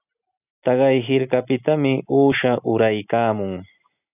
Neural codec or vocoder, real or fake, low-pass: none; real; 3.6 kHz